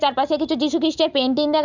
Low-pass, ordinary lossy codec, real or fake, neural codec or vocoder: 7.2 kHz; none; real; none